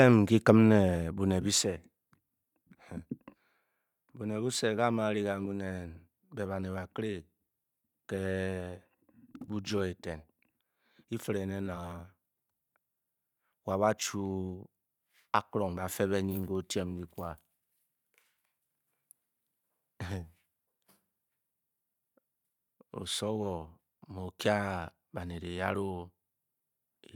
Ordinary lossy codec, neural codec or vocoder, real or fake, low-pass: none; vocoder, 44.1 kHz, 128 mel bands every 512 samples, BigVGAN v2; fake; 19.8 kHz